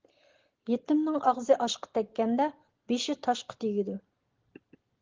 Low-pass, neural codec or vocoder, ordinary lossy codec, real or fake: 7.2 kHz; none; Opus, 16 kbps; real